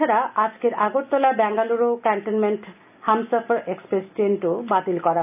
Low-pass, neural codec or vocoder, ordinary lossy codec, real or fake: 3.6 kHz; none; none; real